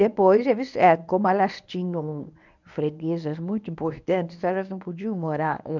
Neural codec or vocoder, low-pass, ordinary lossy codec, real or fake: codec, 24 kHz, 0.9 kbps, WavTokenizer, medium speech release version 1; 7.2 kHz; none; fake